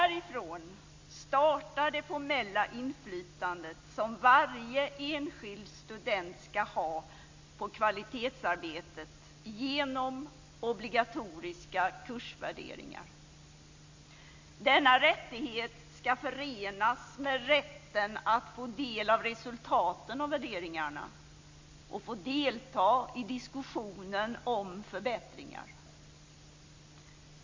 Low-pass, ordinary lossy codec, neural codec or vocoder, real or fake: 7.2 kHz; MP3, 48 kbps; none; real